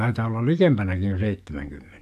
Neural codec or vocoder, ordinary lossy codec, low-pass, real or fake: codec, 44.1 kHz, 7.8 kbps, DAC; none; 14.4 kHz; fake